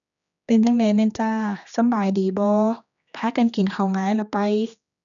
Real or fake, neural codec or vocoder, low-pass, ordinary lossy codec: fake; codec, 16 kHz, 2 kbps, X-Codec, HuBERT features, trained on general audio; 7.2 kHz; none